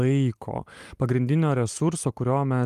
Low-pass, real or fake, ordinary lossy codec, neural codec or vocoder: 14.4 kHz; real; Opus, 32 kbps; none